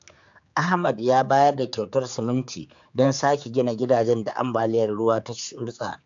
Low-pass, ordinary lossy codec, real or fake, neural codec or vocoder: 7.2 kHz; AAC, 64 kbps; fake; codec, 16 kHz, 4 kbps, X-Codec, HuBERT features, trained on general audio